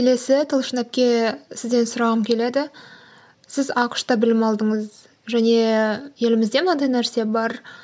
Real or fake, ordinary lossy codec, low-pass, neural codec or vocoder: real; none; none; none